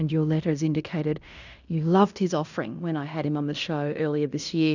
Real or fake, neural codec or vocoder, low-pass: fake; codec, 16 kHz in and 24 kHz out, 0.9 kbps, LongCat-Audio-Codec, fine tuned four codebook decoder; 7.2 kHz